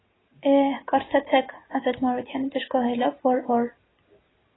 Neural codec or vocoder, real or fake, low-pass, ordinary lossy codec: none; real; 7.2 kHz; AAC, 16 kbps